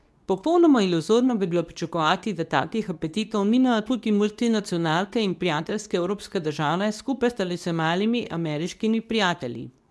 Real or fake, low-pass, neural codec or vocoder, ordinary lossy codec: fake; none; codec, 24 kHz, 0.9 kbps, WavTokenizer, medium speech release version 2; none